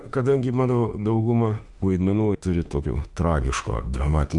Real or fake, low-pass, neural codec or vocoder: fake; 10.8 kHz; autoencoder, 48 kHz, 32 numbers a frame, DAC-VAE, trained on Japanese speech